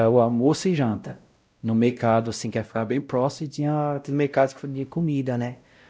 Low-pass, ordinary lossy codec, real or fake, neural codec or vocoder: none; none; fake; codec, 16 kHz, 0.5 kbps, X-Codec, WavLM features, trained on Multilingual LibriSpeech